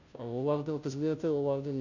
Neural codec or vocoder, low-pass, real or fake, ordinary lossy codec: codec, 16 kHz, 0.5 kbps, FunCodec, trained on Chinese and English, 25 frames a second; 7.2 kHz; fake; none